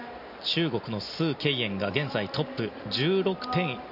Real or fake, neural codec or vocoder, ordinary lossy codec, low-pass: real; none; none; 5.4 kHz